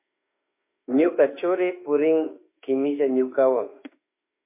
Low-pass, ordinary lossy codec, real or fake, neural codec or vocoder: 3.6 kHz; MP3, 24 kbps; fake; autoencoder, 48 kHz, 32 numbers a frame, DAC-VAE, trained on Japanese speech